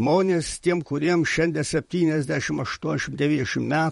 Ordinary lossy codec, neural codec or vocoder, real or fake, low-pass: MP3, 48 kbps; vocoder, 44.1 kHz, 128 mel bands, Pupu-Vocoder; fake; 19.8 kHz